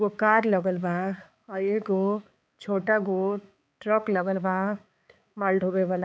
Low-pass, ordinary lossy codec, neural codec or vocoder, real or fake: none; none; codec, 16 kHz, 4 kbps, X-Codec, HuBERT features, trained on balanced general audio; fake